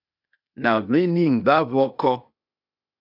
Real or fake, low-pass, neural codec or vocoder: fake; 5.4 kHz; codec, 16 kHz, 0.8 kbps, ZipCodec